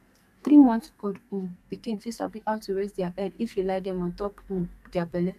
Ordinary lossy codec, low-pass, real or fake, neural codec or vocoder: none; 14.4 kHz; fake; codec, 44.1 kHz, 2.6 kbps, SNAC